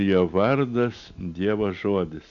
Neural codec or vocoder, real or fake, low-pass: none; real; 7.2 kHz